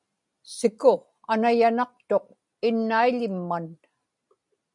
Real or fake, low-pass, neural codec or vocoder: real; 10.8 kHz; none